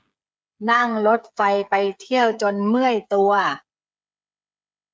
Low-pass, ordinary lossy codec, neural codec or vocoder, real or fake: none; none; codec, 16 kHz, 8 kbps, FreqCodec, smaller model; fake